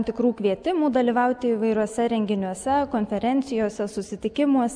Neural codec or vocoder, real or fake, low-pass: none; real; 9.9 kHz